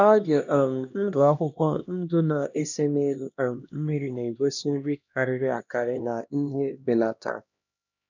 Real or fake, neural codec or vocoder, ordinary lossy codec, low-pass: fake; codec, 16 kHz, 1 kbps, X-Codec, HuBERT features, trained on LibriSpeech; none; 7.2 kHz